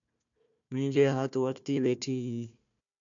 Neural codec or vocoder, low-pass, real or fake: codec, 16 kHz, 1 kbps, FunCodec, trained on Chinese and English, 50 frames a second; 7.2 kHz; fake